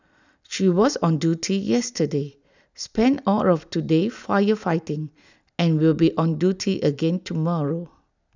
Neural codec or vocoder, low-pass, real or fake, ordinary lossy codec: none; 7.2 kHz; real; none